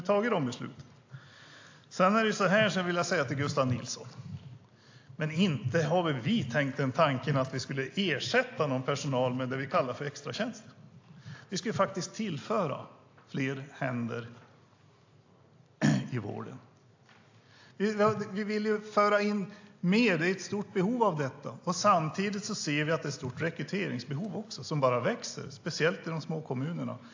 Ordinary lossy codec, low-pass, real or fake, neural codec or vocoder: AAC, 48 kbps; 7.2 kHz; real; none